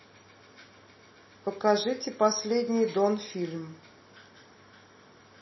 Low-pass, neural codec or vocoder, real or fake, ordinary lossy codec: 7.2 kHz; none; real; MP3, 24 kbps